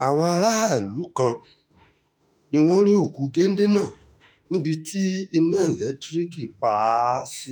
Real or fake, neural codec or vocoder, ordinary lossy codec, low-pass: fake; autoencoder, 48 kHz, 32 numbers a frame, DAC-VAE, trained on Japanese speech; none; none